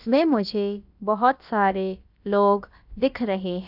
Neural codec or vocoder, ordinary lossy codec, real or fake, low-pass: codec, 16 kHz, about 1 kbps, DyCAST, with the encoder's durations; AAC, 48 kbps; fake; 5.4 kHz